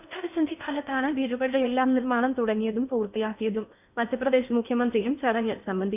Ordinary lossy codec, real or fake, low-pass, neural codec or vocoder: none; fake; 3.6 kHz; codec, 16 kHz in and 24 kHz out, 0.6 kbps, FocalCodec, streaming, 4096 codes